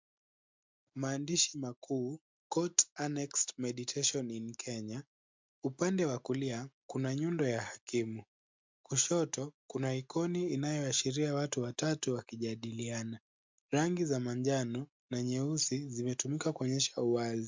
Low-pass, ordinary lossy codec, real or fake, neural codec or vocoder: 7.2 kHz; MP3, 64 kbps; real; none